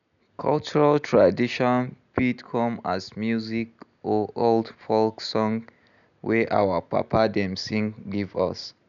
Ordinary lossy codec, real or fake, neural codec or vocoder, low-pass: none; real; none; 7.2 kHz